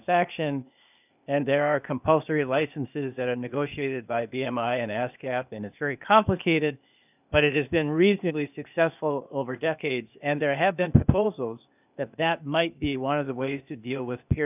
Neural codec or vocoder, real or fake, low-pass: codec, 16 kHz, 0.8 kbps, ZipCodec; fake; 3.6 kHz